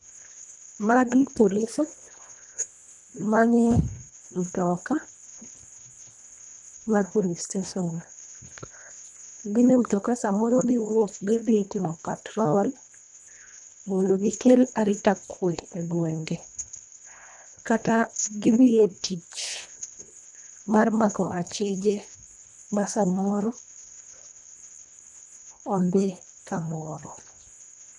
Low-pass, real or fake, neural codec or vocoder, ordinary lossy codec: 10.8 kHz; fake; codec, 24 kHz, 1.5 kbps, HILCodec; none